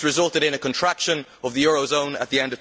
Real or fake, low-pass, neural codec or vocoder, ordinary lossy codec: real; none; none; none